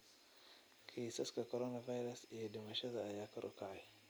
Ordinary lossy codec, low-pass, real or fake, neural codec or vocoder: none; none; real; none